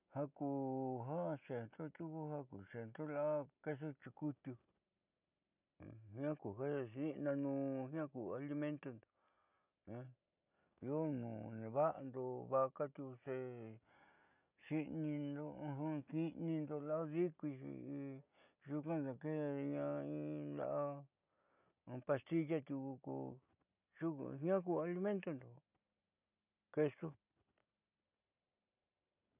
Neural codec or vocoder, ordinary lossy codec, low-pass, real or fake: none; none; 3.6 kHz; real